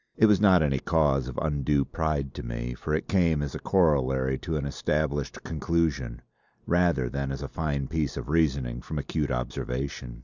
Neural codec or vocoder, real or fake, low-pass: none; real; 7.2 kHz